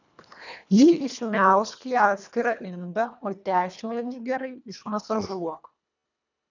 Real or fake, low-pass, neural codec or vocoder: fake; 7.2 kHz; codec, 24 kHz, 1.5 kbps, HILCodec